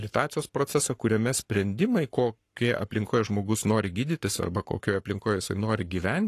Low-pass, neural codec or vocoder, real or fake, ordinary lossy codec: 14.4 kHz; codec, 44.1 kHz, 7.8 kbps, Pupu-Codec; fake; AAC, 48 kbps